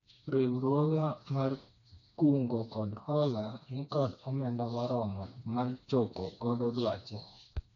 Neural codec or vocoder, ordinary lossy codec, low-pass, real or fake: codec, 16 kHz, 2 kbps, FreqCodec, smaller model; none; 7.2 kHz; fake